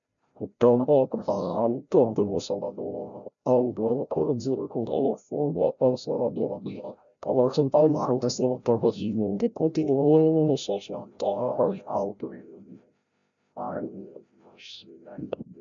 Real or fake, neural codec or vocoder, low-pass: fake; codec, 16 kHz, 0.5 kbps, FreqCodec, larger model; 7.2 kHz